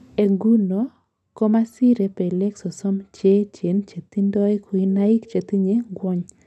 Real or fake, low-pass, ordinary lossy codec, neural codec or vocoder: real; none; none; none